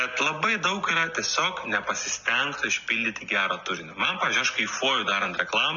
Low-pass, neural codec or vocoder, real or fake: 7.2 kHz; none; real